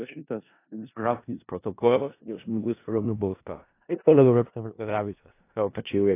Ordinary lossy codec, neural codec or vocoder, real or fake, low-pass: AAC, 24 kbps; codec, 16 kHz in and 24 kHz out, 0.4 kbps, LongCat-Audio-Codec, four codebook decoder; fake; 3.6 kHz